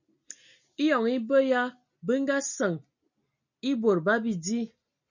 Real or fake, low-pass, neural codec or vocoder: real; 7.2 kHz; none